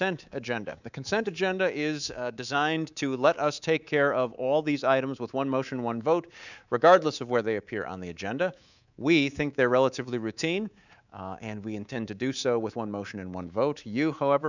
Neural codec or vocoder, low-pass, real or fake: codec, 24 kHz, 3.1 kbps, DualCodec; 7.2 kHz; fake